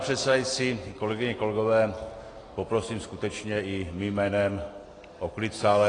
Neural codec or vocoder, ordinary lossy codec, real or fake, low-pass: none; AAC, 32 kbps; real; 9.9 kHz